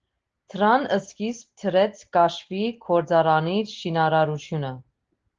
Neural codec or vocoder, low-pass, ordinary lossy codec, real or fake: none; 7.2 kHz; Opus, 16 kbps; real